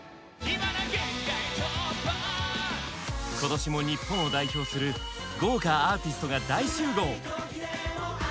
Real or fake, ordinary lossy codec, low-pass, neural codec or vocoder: real; none; none; none